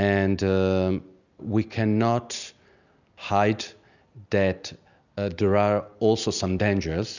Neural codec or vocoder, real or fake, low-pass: none; real; 7.2 kHz